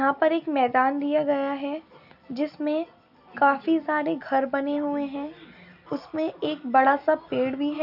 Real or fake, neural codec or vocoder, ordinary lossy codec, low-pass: real; none; none; 5.4 kHz